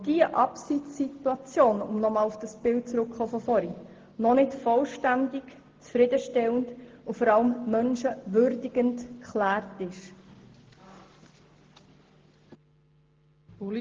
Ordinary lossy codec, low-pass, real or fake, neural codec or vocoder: Opus, 16 kbps; 7.2 kHz; real; none